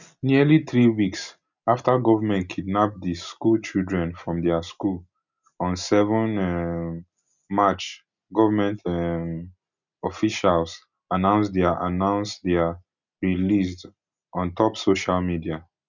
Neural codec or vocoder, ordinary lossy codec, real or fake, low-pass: none; none; real; 7.2 kHz